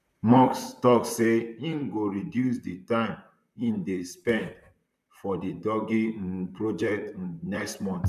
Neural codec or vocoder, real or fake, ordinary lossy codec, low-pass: vocoder, 44.1 kHz, 128 mel bands, Pupu-Vocoder; fake; none; 14.4 kHz